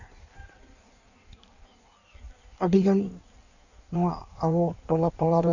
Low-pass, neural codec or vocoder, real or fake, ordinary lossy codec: 7.2 kHz; codec, 16 kHz in and 24 kHz out, 1.1 kbps, FireRedTTS-2 codec; fake; none